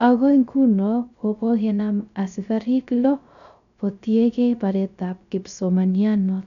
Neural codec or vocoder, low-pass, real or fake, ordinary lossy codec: codec, 16 kHz, 0.3 kbps, FocalCodec; 7.2 kHz; fake; none